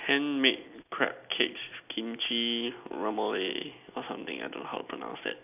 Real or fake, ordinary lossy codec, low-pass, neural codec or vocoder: real; none; 3.6 kHz; none